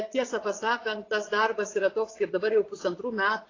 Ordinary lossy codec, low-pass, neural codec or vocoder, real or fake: AAC, 32 kbps; 7.2 kHz; vocoder, 22.05 kHz, 80 mel bands, Vocos; fake